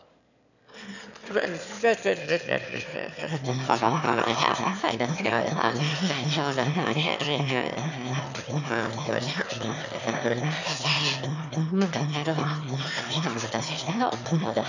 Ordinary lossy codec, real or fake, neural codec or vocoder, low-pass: none; fake; autoencoder, 22.05 kHz, a latent of 192 numbers a frame, VITS, trained on one speaker; 7.2 kHz